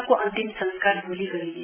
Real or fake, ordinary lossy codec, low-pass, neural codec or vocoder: real; none; 3.6 kHz; none